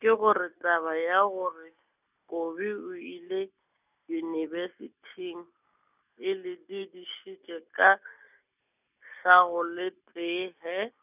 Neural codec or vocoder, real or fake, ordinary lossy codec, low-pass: none; real; none; 3.6 kHz